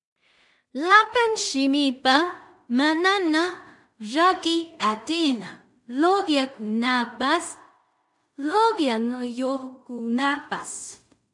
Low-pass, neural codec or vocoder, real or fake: 10.8 kHz; codec, 16 kHz in and 24 kHz out, 0.4 kbps, LongCat-Audio-Codec, two codebook decoder; fake